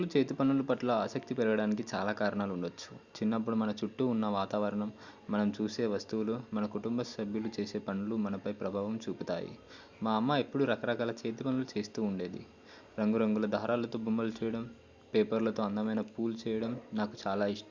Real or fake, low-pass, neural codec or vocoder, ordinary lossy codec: real; 7.2 kHz; none; Opus, 64 kbps